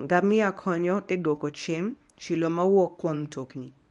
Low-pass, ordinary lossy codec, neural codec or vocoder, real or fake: 10.8 kHz; none; codec, 24 kHz, 0.9 kbps, WavTokenizer, medium speech release version 1; fake